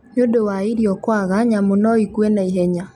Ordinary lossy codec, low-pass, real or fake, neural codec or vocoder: MP3, 96 kbps; 19.8 kHz; real; none